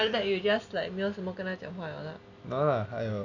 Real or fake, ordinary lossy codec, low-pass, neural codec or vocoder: real; none; 7.2 kHz; none